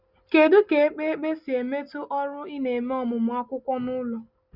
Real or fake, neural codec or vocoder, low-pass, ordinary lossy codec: real; none; 5.4 kHz; Opus, 64 kbps